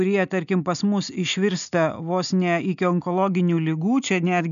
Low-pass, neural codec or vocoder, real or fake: 7.2 kHz; none; real